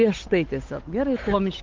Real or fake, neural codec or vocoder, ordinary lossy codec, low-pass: fake; codec, 16 kHz, 8 kbps, FunCodec, trained on Chinese and English, 25 frames a second; Opus, 32 kbps; 7.2 kHz